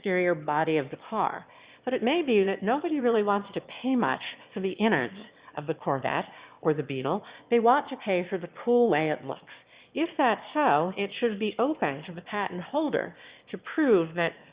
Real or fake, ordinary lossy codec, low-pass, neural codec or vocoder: fake; Opus, 64 kbps; 3.6 kHz; autoencoder, 22.05 kHz, a latent of 192 numbers a frame, VITS, trained on one speaker